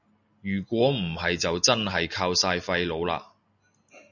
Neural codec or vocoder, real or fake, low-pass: none; real; 7.2 kHz